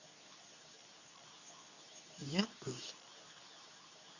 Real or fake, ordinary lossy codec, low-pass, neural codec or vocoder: fake; none; 7.2 kHz; codec, 24 kHz, 0.9 kbps, WavTokenizer, medium speech release version 2